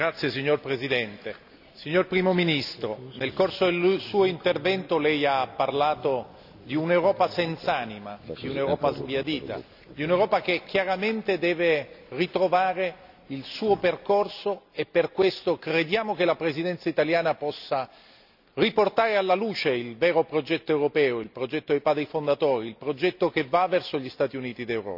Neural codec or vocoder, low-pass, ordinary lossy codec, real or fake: none; 5.4 kHz; none; real